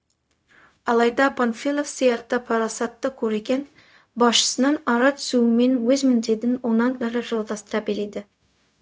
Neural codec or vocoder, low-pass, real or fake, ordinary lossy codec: codec, 16 kHz, 0.4 kbps, LongCat-Audio-Codec; none; fake; none